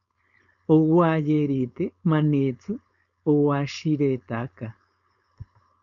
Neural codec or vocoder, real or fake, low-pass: codec, 16 kHz, 4.8 kbps, FACodec; fake; 7.2 kHz